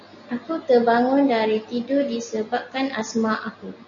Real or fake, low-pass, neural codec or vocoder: real; 7.2 kHz; none